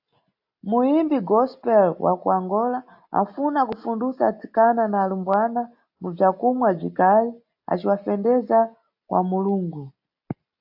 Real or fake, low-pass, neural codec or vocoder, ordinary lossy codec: real; 5.4 kHz; none; AAC, 48 kbps